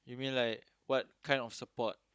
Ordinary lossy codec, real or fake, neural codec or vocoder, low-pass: none; fake; codec, 16 kHz, 16 kbps, FunCodec, trained on Chinese and English, 50 frames a second; none